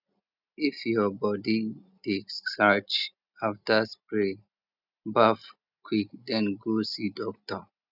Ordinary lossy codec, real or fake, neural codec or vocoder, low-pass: none; real; none; 5.4 kHz